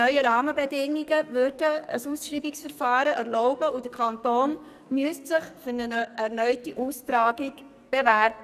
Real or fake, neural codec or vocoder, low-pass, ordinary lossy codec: fake; codec, 44.1 kHz, 2.6 kbps, SNAC; 14.4 kHz; none